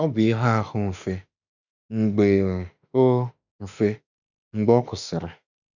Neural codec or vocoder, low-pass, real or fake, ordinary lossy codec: autoencoder, 48 kHz, 32 numbers a frame, DAC-VAE, trained on Japanese speech; 7.2 kHz; fake; none